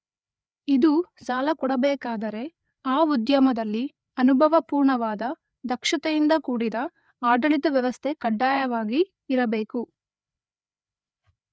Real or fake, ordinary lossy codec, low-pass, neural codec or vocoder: fake; none; none; codec, 16 kHz, 4 kbps, FreqCodec, larger model